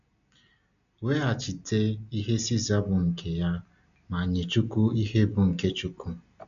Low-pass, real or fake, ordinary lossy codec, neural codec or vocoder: 7.2 kHz; real; none; none